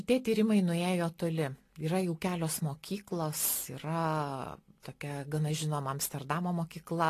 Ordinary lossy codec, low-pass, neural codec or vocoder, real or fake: AAC, 48 kbps; 14.4 kHz; none; real